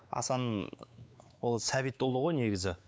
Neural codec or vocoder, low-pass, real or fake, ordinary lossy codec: codec, 16 kHz, 4 kbps, X-Codec, WavLM features, trained on Multilingual LibriSpeech; none; fake; none